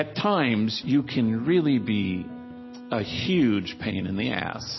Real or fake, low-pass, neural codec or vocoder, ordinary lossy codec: real; 7.2 kHz; none; MP3, 24 kbps